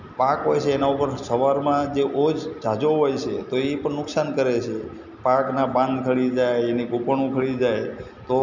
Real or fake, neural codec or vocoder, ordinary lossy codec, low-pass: real; none; none; 7.2 kHz